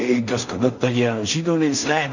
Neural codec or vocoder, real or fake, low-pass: codec, 16 kHz in and 24 kHz out, 0.4 kbps, LongCat-Audio-Codec, two codebook decoder; fake; 7.2 kHz